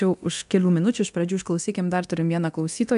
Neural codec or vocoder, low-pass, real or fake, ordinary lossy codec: codec, 24 kHz, 0.9 kbps, DualCodec; 10.8 kHz; fake; AAC, 96 kbps